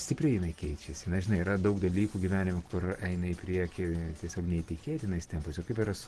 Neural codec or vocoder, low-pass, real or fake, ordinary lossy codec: none; 10.8 kHz; real; Opus, 16 kbps